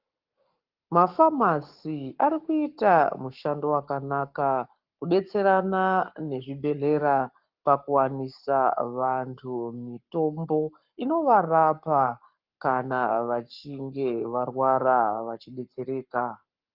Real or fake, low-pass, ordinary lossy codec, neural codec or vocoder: fake; 5.4 kHz; Opus, 24 kbps; codec, 44.1 kHz, 7.8 kbps, Pupu-Codec